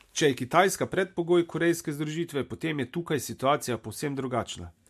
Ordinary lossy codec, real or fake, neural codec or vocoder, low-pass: MP3, 64 kbps; real; none; 14.4 kHz